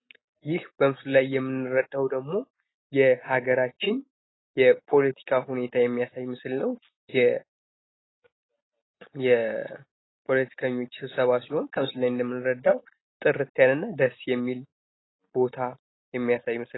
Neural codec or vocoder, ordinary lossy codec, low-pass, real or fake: none; AAC, 16 kbps; 7.2 kHz; real